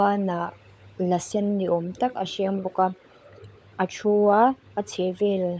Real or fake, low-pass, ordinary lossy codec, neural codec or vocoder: fake; none; none; codec, 16 kHz, 16 kbps, FunCodec, trained on LibriTTS, 50 frames a second